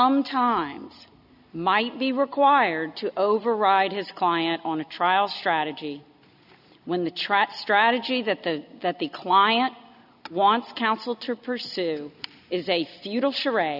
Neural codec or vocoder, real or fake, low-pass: none; real; 5.4 kHz